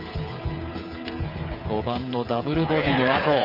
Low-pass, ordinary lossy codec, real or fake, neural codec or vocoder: 5.4 kHz; none; fake; codec, 16 kHz, 8 kbps, FreqCodec, smaller model